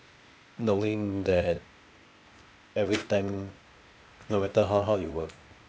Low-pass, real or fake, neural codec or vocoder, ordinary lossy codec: none; fake; codec, 16 kHz, 0.8 kbps, ZipCodec; none